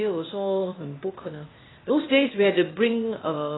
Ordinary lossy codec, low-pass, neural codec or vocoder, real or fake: AAC, 16 kbps; 7.2 kHz; codec, 16 kHz, 0.8 kbps, ZipCodec; fake